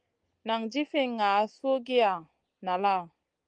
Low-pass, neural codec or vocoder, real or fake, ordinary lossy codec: 9.9 kHz; none; real; Opus, 24 kbps